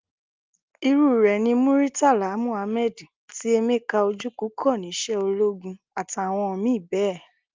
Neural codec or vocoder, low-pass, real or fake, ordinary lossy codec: none; 7.2 kHz; real; Opus, 32 kbps